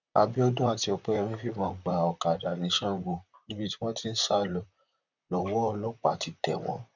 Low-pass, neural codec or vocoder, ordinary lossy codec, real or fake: 7.2 kHz; vocoder, 44.1 kHz, 128 mel bands, Pupu-Vocoder; none; fake